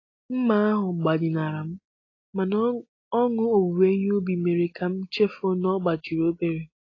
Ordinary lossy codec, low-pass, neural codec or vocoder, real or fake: AAC, 32 kbps; 7.2 kHz; none; real